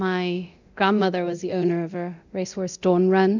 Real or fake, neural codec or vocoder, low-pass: fake; codec, 24 kHz, 0.9 kbps, DualCodec; 7.2 kHz